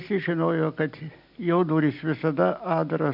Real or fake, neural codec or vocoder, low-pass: real; none; 5.4 kHz